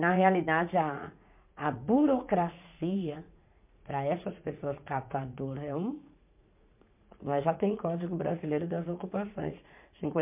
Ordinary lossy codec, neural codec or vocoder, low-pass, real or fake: MP3, 32 kbps; vocoder, 44.1 kHz, 80 mel bands, Vocos; 3.6 kHz; fake